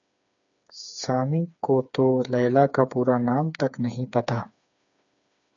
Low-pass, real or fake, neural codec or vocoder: 7.2 kHz; fake; codec, 16 kHz, 4 kbps, FreqCodec, smaller model